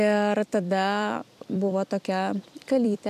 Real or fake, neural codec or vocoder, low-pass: fake; vocoder, 44.1 kHz, 128 mel bands every 256 samples, BigVGAN v2; 14.4 kHz